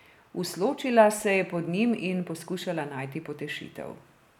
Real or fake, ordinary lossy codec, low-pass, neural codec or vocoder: real; none; 19.8 kHz; none